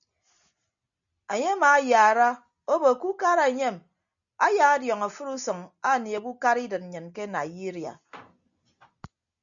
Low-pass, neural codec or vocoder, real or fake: 7.2 kHz; none; real